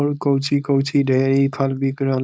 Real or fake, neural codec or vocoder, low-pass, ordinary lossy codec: fake; codec, 16 kHz, 4.8 kbps, FACodec; none; none